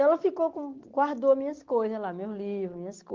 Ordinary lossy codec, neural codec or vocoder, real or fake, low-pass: Opus, 16 kbps; none; real; 7.2 kHz